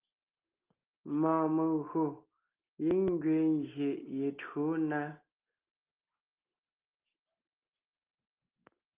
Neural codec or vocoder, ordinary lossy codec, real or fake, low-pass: none; Opus, 32 kbps; real; 3.6 kHz